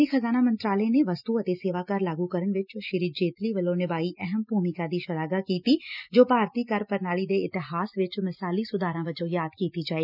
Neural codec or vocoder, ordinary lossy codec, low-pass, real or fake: none; none; 5.4 kHz; real